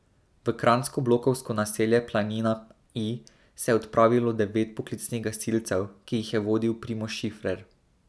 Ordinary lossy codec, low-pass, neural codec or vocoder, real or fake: none; none; none; real